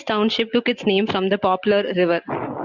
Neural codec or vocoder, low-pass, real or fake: none; 7.2 kHz; real